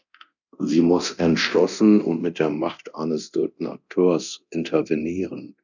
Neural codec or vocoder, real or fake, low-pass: codec, 24 kHz, 0.9 kbps, DualCodec; fake; 7.2 kHz